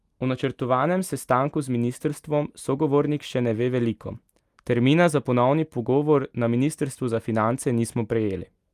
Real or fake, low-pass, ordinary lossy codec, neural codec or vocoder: real; 14.4 kHz; Opus, 32 kbps; none